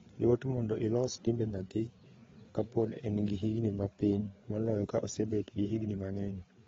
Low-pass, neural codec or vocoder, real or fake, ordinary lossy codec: 7.2 kHz; codec, 16 kHz, 4 kbps, FreqCodec, larger model; fake; AAC, 24 kbps